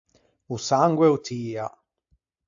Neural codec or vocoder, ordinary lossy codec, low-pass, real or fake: none; AAC, 64 kbps; 7.2 kHz; real